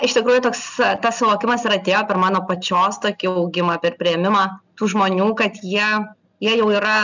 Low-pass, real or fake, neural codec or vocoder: 7.2 kHz; real; none